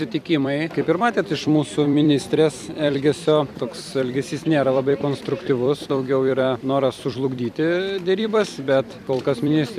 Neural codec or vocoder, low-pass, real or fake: vocoder, 44.1 kHz, 128 mel bands every 256 samples, BigVGAN v2; 14.4 kHz; fake